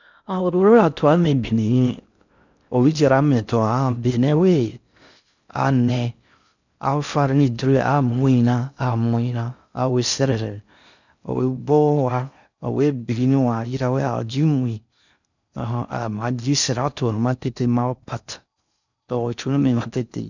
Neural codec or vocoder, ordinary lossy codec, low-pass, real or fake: codec, 16 kHz in and 24 kHz out, 0.6 kbps, FocalCodec, streaming, 4096 codes; none; 7.2 kHz; fake